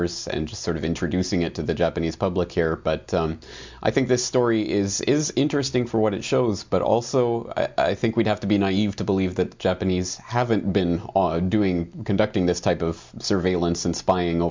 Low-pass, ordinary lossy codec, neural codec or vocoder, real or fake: 7.2 kHz; MP3, 64 kbps; none; real